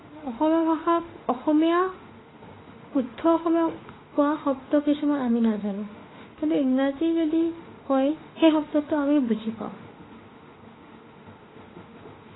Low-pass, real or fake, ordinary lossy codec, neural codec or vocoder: 7.2 kHz; fake; AAC, 16 kbps; autoencoder, 48 kHz, 32 numbers a frame, DAC-VAE, trained on Japanese speech